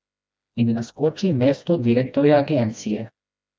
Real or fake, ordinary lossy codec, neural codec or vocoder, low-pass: fake; none; codec, 16 kHz, 1 kbps, FreqCodec, smaller model; none